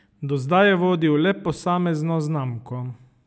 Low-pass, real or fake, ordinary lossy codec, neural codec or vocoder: none; real; none; none